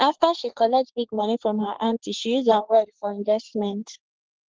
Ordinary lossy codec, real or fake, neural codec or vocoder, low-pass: Opus, 16 kbps; fake; codec, 44.1 kHz, 3.4 kbps, Pupu-Codec; 7.2 kHz